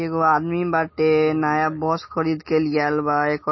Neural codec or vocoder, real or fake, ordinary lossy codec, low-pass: none; real; MP3, 24 kbps; 7.2 kHz